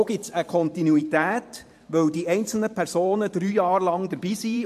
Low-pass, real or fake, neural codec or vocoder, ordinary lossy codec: 14.4 kHz; fake; vocoder, 44.1 kHz, 128 mel bands every 512 samples, BigVGAN v2; MP3, 64 kbps